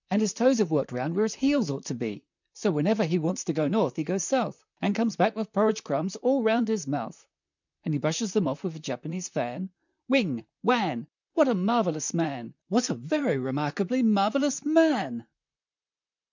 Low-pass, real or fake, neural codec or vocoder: 7.2 kHz; fake; vocoder, 44.1 kHz, 128 mel bands, Pupu-Vocoder